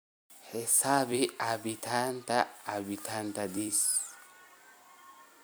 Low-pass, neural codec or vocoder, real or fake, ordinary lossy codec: none; vocoder, 44.1 kHz, 128 mel bands every 256 samples, BigVGAN v2; fake; none